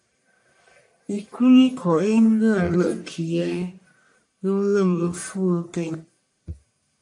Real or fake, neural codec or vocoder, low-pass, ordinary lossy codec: fake; codec, 44.1 kHz, 1.7 kbps, Pupu-Codec; 10.8 kHz; AAC, 64 kbps